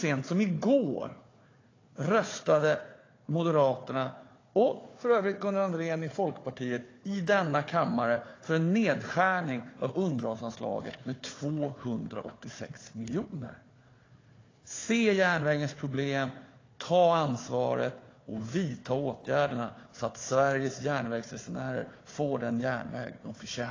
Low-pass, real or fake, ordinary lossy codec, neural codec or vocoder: 7.2 kHz; fake; AAC, 32 kbps; codec, 16 kHz, 4 kbps, FunCodec, trained on Chinese and English, 50 frames a second